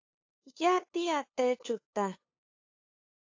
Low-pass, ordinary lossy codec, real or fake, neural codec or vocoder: 7.2 kHz; AAC, 48 kbps; fake; codec, 16 kHz, 2 kbps, FunCodec, trained on LibriTTS, 25 frames a second